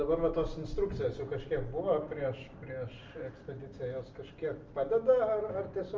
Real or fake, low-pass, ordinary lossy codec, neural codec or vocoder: real; 7.2 kHz; Opus, 32 kbps; none